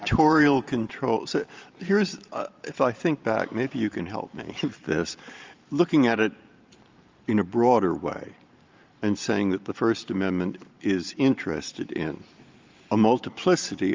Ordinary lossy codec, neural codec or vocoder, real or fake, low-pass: Opus, 24 kbps; vocoder, 44.1 kHz, 128 mel bands every 512 samples, BigVGAN v2; fake; 7.2 kHz